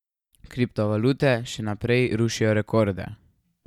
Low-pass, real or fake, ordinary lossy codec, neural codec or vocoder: 19.8 kHz; real; none; none